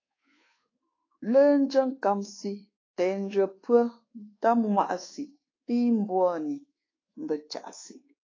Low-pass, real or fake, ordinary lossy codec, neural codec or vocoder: 7.2 kHz; fake; AAC, 32 kbps; codec, 24 kHz, 1.2 kbps, DualCodec